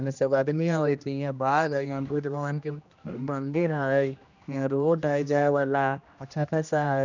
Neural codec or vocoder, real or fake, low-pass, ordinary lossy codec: codec, 16 kHz, 1 kbps, X-Codec, HuBERT features, trained on general audio; fake; 7.2 kHz; none